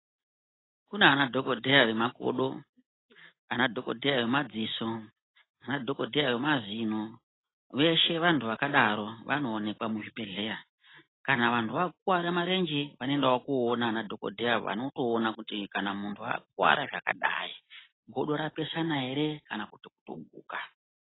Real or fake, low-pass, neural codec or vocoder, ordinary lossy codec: real; 7.2 kHz; none; AAC, 16 kbps